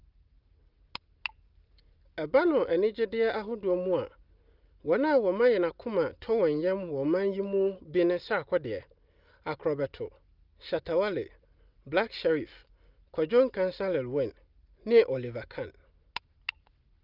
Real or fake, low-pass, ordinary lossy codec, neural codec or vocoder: real; 5.4 kHz; Opus, 32 kbps; none